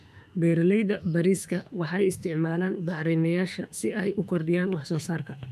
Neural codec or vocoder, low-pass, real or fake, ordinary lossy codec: autoencoder, 48 kHz, 32 numbers a frame, DAC-VAE, trained on Japanese speech; 14.4 kHz; fake; none